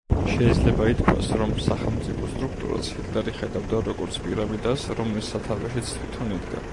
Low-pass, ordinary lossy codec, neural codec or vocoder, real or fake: 10.8 kHz; AAC, 48 kbps; none; real